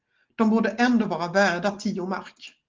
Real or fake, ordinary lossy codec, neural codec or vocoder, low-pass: real; Opus, 16 kbps; none; 7.2 kHz